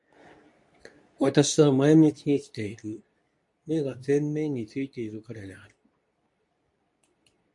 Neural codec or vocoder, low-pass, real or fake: codec, 24 kHz, 0.9 kbps, WavTokenizer, medium speech release version 2; 10.8 kHz; fake